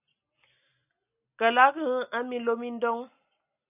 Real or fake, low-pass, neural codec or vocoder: real; 3.6 kHz; none